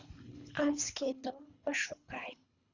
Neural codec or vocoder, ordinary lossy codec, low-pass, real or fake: codec, 24 kHz, 3 kbps, HILCodec; Opus, 64 kbps; 7.2 kHz; fake